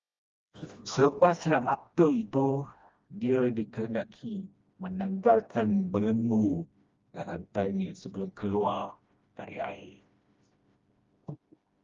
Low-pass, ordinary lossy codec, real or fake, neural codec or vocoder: 7.2 kHz; Opus, 32 kbps; fake; codec, 16 kHz, 1 kbps, FreqCodec, smaller model